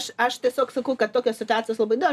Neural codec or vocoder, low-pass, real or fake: none; 14.4 kHz; real